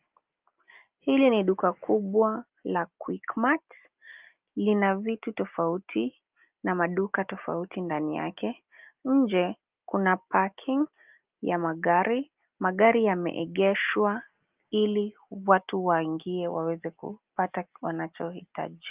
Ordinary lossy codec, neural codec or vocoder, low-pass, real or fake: Opus, 24 kbps; none; 3.6 kHz; real